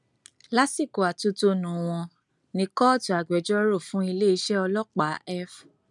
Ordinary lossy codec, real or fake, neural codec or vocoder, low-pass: none; real; none; 10.8 kHz